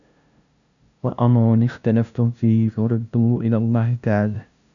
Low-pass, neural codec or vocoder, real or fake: 7.2 kHz; codec, 16 kHz, 0.5 kbps, FunCodec, trained on LibriTTS, 25 frames a second; fake